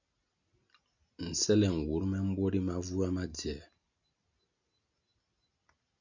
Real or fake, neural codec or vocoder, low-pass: real; none; 7.2 kHz